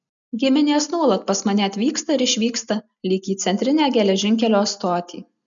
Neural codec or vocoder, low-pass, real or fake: none; 7.2 kHz; real